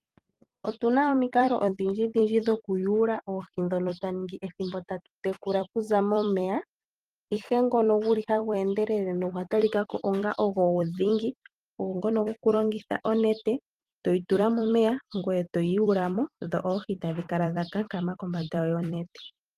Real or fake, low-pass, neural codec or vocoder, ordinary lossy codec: fake; 14.4 kHz; vocoder, 44.1 kHz, 128 mel bands every 256 samples, BigVGAN v2; Opus, 32 kbps